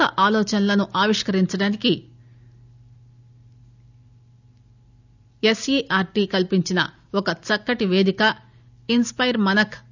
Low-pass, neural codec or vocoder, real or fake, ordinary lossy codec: 7.2 kHz; none; real; none